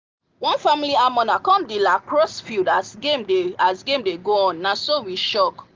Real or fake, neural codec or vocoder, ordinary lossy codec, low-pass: real; none; Opus, 24 kbps; 7.2 kHz